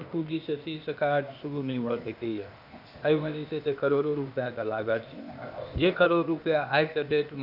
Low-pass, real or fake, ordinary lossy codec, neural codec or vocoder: 5.4 kHz; fake; none; codec, 16 kHz, 0.8 kbps, ZipCodec